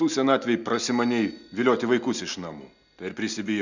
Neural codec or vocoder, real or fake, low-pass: none; real; 7.2 kHz